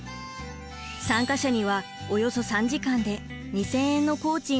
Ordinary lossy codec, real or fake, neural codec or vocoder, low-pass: none; real; none; none